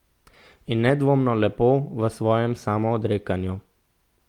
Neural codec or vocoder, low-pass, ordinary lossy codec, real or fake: none; 19.8 kHz; Opus, 24 kbps; real